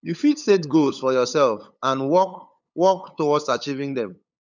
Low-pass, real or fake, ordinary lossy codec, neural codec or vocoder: 7.2 kHz; fake; none; codec, 16 kHz, 8 kbps, FunCodec, trained on LibriTTS, 25 frames a second